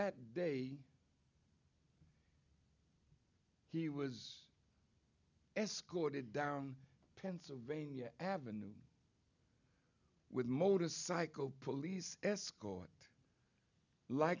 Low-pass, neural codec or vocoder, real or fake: 7.2 kHz; none; real